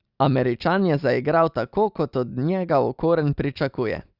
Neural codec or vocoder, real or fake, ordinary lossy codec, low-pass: none; real; Opus, 64 kbps; 5.4 kHz